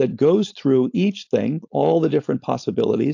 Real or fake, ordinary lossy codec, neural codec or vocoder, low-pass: fake; AAC, 48 kbps; codec, 16 kHz, 4.8 kbps, FACodec; 7.2 kHz